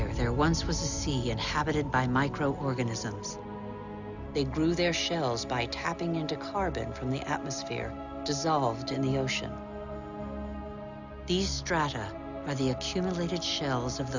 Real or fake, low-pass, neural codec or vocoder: real; 7.2 kHz; none